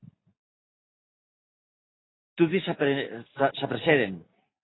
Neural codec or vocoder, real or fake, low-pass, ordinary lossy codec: none; real; 7.2 kHz; AAC, 16 kbps